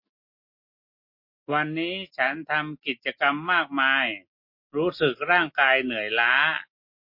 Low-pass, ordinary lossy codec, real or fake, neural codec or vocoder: 5.4 kHz; MP3, 32 kbps; real; none